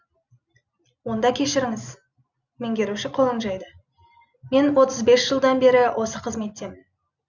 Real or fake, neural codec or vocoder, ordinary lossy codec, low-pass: real; none; none; 7.2 kHz